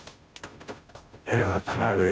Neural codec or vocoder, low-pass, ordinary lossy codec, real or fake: codec, 16 kHz, 0.5 kbps, FunCodec, trained on Chinese and English, 25 frames a second; none; none; fake